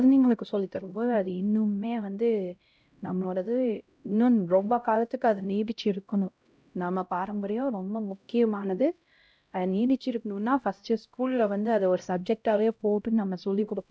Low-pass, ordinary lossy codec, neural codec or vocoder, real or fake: none; none; codec, 16 kHz, 0.5 kbps, X-Codec, HuBERT features, trained on LibriSpeech; fake